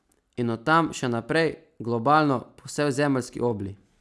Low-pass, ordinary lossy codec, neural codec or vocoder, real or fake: none; none; none; real